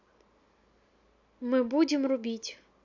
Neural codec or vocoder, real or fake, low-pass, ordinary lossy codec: none; real; 7.2 kHz; AAC, 48 kbps